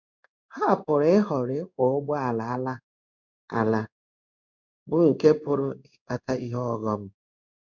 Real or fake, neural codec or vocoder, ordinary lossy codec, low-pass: fake; codec, 16 kHz in and 24 kHz out, 1 kbps, XY-Tokenizer; none; 7.2 kHz